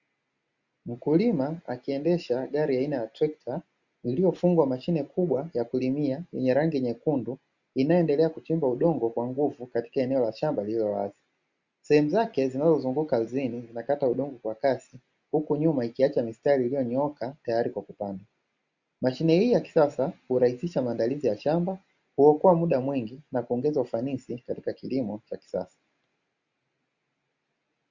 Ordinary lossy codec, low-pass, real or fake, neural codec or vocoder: Opus, 64 kbps; 7.2 kHz; real; none